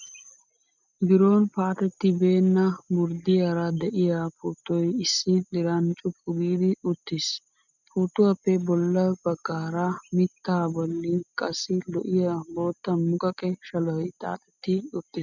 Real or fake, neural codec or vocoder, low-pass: real; none; 7.2 kHz